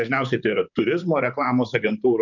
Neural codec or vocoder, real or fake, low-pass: vocoder, 44.1 kHz, 128 mel bands, Pupu-Vocoder; fake; 7.2 kHz